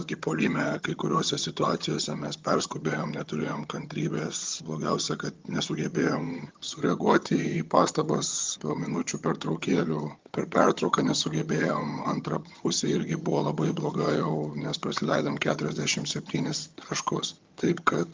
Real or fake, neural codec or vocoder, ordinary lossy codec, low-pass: fake; vocoder, 22.05 kHz, 80 mel bands, HiFi-GAN; Opus, 24 kbps; 7.2 kHz